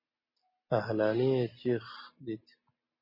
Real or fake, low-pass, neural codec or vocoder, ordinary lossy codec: real; 5.4 kHz; none; MP3, 24 kbps